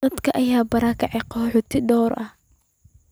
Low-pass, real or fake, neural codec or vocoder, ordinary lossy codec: none; real; none; none